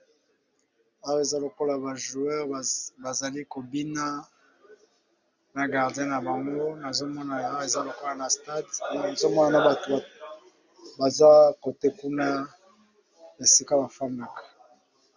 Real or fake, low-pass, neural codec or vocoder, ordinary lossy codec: real; 7.2 kHz; none; Opus, 64 kbps